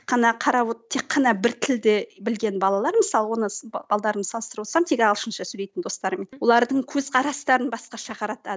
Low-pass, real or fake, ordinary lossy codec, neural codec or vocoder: none; real; none; none